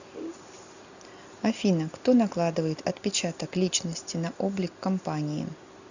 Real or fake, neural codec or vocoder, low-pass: real; none; 7.2 kHz